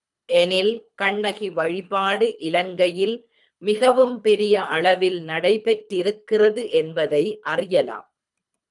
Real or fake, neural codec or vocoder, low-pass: fake; codec, 24 kHz, 3 kbps, HILCodec; 10.8 kHz